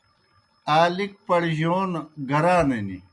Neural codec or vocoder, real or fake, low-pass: none; real; 10.8 kHz